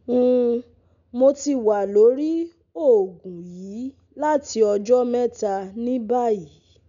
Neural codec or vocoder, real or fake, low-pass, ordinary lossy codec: none; real; 7.2 kHz; none